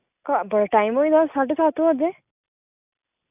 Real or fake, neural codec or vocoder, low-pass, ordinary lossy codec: real; none; 3.6 kHz; none